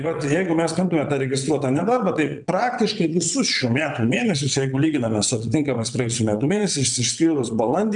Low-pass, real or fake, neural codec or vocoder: 9.9 kHz; fake; vocoder, 22.05 kHz, 80 mel bands, WaveNeXt